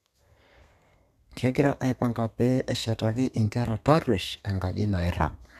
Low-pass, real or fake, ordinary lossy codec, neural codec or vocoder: 14.4 kHz; fake; none; codec, 32 kHz, 1.9 kbps, SNAC